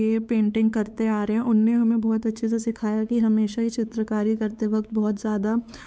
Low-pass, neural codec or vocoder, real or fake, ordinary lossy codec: none; codec, 16 kHz, 4 kbps, X-Codec, WavLM features, trained on Multilingual LibriSpeech; fake; none